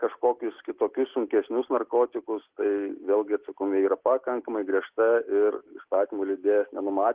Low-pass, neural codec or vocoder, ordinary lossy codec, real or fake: 3.6 kHz; none; Opus, 16 kbps; real